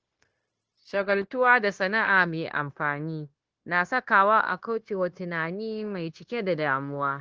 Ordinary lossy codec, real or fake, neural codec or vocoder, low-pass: Opus, 16 kbps; fake; codec, 16 kHz, 0.9 kbps, LongCat-Audio-Codec; 7.2 kHz